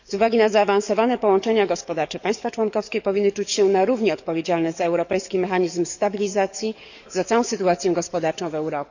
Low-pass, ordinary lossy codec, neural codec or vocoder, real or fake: 7.2 kHz; none; codec, 44.1 kHz, 7.8 kbps, DAC; fake